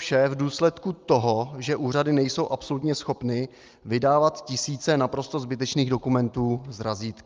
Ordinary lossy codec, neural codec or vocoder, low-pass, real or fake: Opus, 24 kbps; none; 7.2 kHz; real